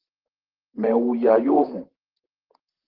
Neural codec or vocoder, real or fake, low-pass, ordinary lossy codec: vocoder, 44.1 kHz, 128 mel bands, Pupu-Vocoder; fake; 5.4 kHz; Opus, 16 kbps